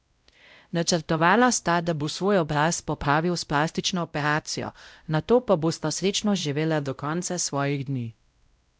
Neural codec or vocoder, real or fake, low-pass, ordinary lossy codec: codec, 16 kHz, 0.5 kbps, X-Codec, WavLM features, trained on Multilingual LibriSpeech; fake; none; none